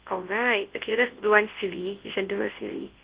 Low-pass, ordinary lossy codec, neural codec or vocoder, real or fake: 3.6 kHz; Opus, 16 kbps; codec, 24 kHz, 0.9 kbps, WavTokenizer, large speech release; fake